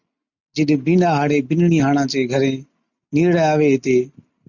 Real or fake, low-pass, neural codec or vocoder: real; 7.2 kHz; none